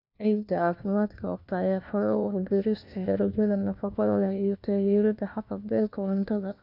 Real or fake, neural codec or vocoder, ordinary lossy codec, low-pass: fake; codec, 16 kHz, 1 kbps, FunCodec, trained on LibriTTS, 50 frames a second; none; 5.4 kHz